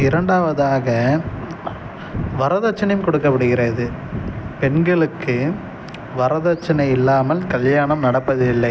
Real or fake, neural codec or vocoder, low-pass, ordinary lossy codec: real; none; none; none